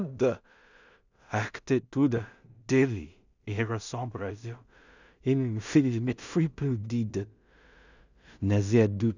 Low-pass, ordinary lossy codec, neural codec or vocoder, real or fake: 7.2 kHz; none; codec, 16 kHz in and 24 kHz out, 0.4 kbps, LongCat-Audio-Codec, two codebook decoder; fake